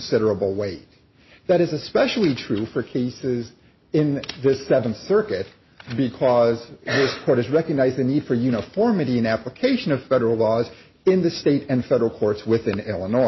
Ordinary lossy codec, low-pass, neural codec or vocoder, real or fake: MP3, 24 kbps; 7.2 kHz; none; real